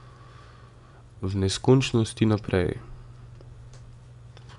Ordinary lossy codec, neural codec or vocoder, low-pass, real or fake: none; vocoder, 24 kHz, 100 mel bands, Vocos; 10.8 kHz; fake